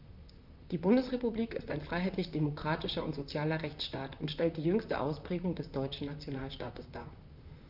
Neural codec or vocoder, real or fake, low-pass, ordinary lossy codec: vocoder, 44.1 kHz, 128 mel bands, Pupu-Vocoder; fake; 5.4 kHz; none